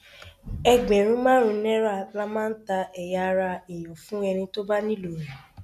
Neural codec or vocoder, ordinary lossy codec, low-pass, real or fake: none; none; 14.4 kHz; real